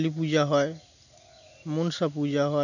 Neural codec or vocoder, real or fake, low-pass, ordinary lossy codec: none; real; 7.2 kHz; none